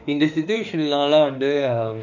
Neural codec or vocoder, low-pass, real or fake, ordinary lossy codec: autoencoder, 48 kHz, 32 numbers a frame, DAC-VAE, trained on Japanese speech; 7.2 kHz; fake; none